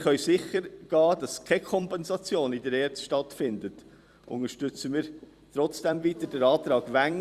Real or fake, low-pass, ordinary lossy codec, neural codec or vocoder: fake; 14.4 kHz; Opus, 64 kbps; vocoder, 44.1 kHz, 128 mel bands every 256 samples, BigVGAN v2